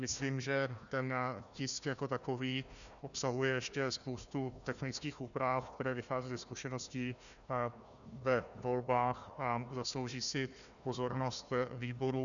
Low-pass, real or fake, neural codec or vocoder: 7.2 kHz; fake; codec, 16 kHz, 1 kbps, FunCodec, trained on Chinese and English, 50 frames a second